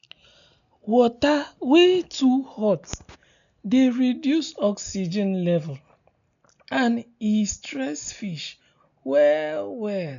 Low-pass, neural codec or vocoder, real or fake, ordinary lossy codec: 7.2 kHz; none; real; none